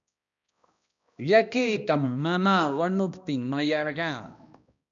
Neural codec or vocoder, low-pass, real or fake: codec, 16 kHz, 1 kbps, X-Codec, HuBERT features, trained on balanced general audio; 7.2 kHz; fake